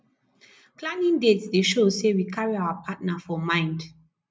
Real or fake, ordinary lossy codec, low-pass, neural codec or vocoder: real; none; none; none